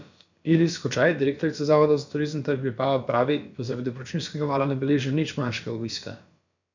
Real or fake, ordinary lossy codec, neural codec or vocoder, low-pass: fake; MP3, 64 kbps; codec, 16 kHz, about 1 kbps, DyCAST, with the encoder's durations; 7.2 kHz